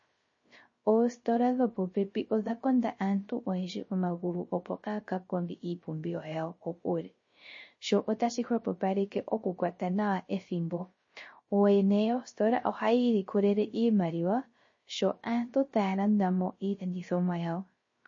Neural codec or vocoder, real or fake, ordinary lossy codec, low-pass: codec, 16 kHz, 0.3 kbps, FocalCodec; fake; MP3, 32 kbps; 7.2 kHz